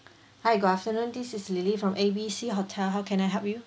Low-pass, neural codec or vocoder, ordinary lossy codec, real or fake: none; none; none; real